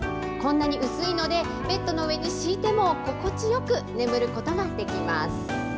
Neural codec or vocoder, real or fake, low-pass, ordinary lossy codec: none; real; none; none